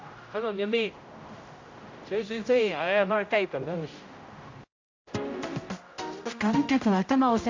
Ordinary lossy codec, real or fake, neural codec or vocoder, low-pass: AAC, 48 kbps; fake; codec, 16 kHz, 0.5 kbps, X-Codec, HuBERT features, trained on general audio; 7.2 kHz